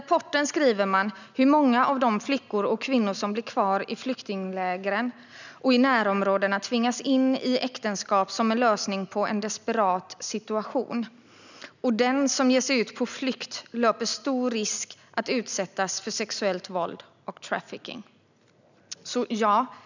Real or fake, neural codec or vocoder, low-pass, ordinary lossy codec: real; none; 7.2 kHz; none